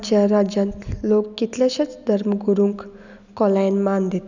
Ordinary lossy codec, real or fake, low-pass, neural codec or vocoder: none; real; 7.2 kHz; none